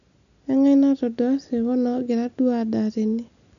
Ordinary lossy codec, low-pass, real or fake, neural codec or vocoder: none; 7.2 kHz; real; none